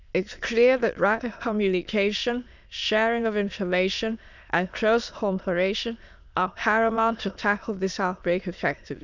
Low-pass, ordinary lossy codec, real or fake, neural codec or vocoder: 7.2 kHz; none; fake; autoencoder, 22.05 kHz, a latent of 192 numbers a frame, VITS, trained on many speakers